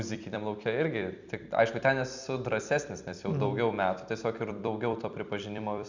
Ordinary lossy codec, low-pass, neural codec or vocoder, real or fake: Opus, 64 kbps; 7.2 kHz; vocoder, 44.1 kHz, 128 mel bands every 256 samples, BigVGAN v2; fake